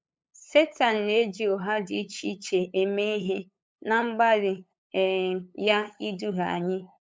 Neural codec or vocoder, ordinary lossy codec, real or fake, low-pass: codec, 16 kHz, 8 kbps, FunCodec, trained on LibriTTS, 25 frames a second; none; fake; none